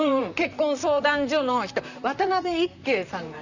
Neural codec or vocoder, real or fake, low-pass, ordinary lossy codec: vocoder, 44.1 kHz, 128 mel bands, Pupu-Vocoder; fake; 7.2 kHz; none